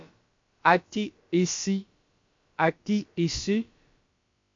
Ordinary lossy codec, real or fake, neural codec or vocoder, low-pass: MP3, 48 kbps; fake; codec, 16 kHz, about 1 kbps, DyCAST, with the encoder's durations; 7.2 kHz